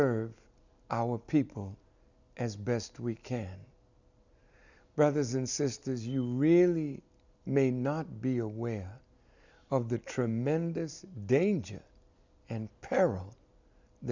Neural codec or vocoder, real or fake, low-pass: none; real; 7.2 kHz